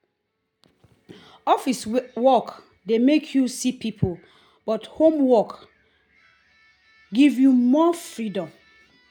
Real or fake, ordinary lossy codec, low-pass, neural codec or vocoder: real; none; 19.8 kHz; none